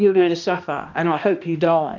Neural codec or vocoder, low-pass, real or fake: codec, 16 kHz, 1 kbps, X-Codec, HuBERT features, trained on balanced general audio; 7.2 kHz; fake